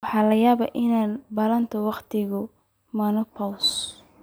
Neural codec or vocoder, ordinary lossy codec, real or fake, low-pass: none; none; real; none